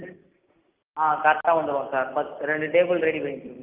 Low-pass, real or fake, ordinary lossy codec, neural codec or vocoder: 3.6 kHz; real; Opus, 32 kbps; none